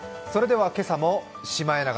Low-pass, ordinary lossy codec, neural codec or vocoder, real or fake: none; none; none; real